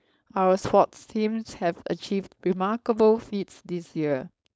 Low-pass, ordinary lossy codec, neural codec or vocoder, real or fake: none; none; codec, 16 kHz, 4.8 kbps, FACodec; fake